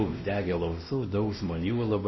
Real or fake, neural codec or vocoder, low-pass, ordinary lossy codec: fake; codec, 16 kHz, 1 kbps, X-Codec, WavLM features, trained on Multilingual LibriSpeech; 7.2 kHz; MP3, 24 kbps